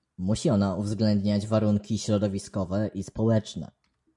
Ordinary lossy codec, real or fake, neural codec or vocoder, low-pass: MP3, 96 kbps; real; none; 10.8 kHz